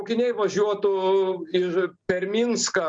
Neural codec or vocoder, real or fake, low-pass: none; real; 9.9 kHz